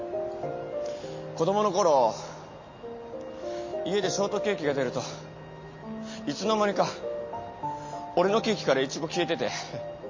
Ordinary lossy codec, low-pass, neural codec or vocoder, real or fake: MP3, 32 kbps; 7.2 kHz; none; real